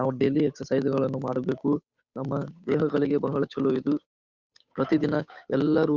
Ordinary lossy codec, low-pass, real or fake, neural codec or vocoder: Opus, 64 kbps; 7.2 kHz; fake; codec, 16 kHz, 8 kbps, FunCodec, trained on Chinese and English, 25 frames a second